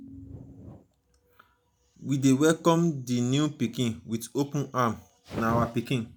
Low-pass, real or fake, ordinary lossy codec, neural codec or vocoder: none; real; none; none